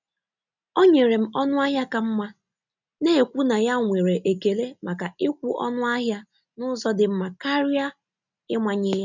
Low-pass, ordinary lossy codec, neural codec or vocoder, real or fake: 7.2 kHz; none; none; real